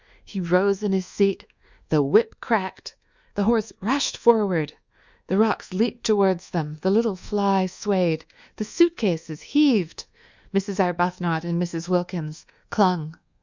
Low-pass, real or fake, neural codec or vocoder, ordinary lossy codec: 7.2 kHz; fake; codec, 24 kHz, 1.2 kbps, DualCodec; Opus, 64 kbps